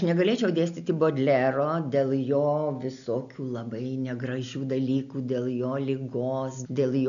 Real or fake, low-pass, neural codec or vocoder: real; 7.2 kHz; none